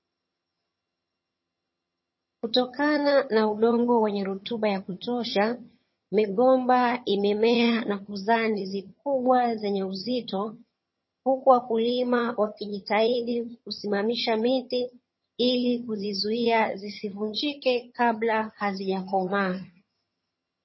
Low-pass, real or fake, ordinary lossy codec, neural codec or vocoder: 7.2 kHz; fake; MP3, 24 kbps; vocoder, 22.05 kHz, 80 mel bands, HiFi-GAN